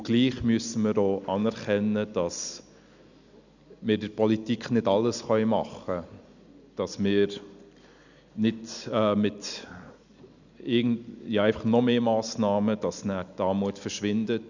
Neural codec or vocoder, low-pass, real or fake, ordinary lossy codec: none; 7.2 kHz; real; none